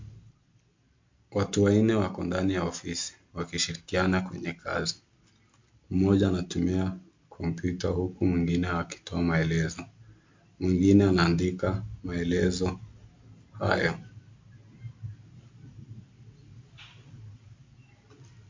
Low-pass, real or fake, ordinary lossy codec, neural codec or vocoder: 7.2 kHz; real; MP3, 48 kbps; none